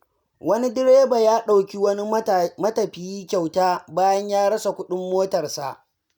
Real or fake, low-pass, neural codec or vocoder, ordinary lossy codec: real; none; none; none